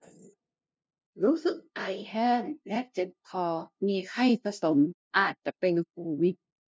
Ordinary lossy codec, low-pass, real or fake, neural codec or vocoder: none; none; fake; codec, 16 kHz, 0.5 kbps, FunCodec, trained on LibriTTS, 25 frames a second